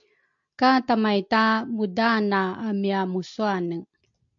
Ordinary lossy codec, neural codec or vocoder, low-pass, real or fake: AAC, 64 kbps; none; 7.2 kHz; real